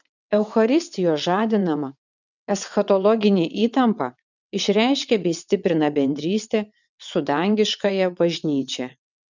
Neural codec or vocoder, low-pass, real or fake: vocoder, 22.05 kHz, 80 mel bands, WaveNeXt; 7.2 kHz; fake